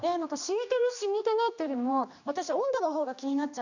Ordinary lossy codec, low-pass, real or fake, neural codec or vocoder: none; 7.2 kHz; fake; codec, 16 kHz, 2 kbps, X-Codec, HuBERT features, trained on general audio